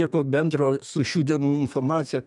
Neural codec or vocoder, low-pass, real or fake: codec, 32 kHz, 1.9 kbps, SNAC; 10.8 kHz; fake